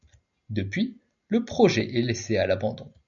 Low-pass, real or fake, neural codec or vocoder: 7.2 kHz; real; none